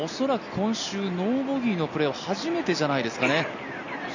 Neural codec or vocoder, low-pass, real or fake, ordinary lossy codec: none; 7.2 kHz; real; none